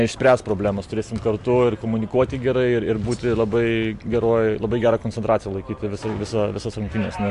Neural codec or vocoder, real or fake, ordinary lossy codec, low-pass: none; real; MP3, 64 kbps; 10.8 kHz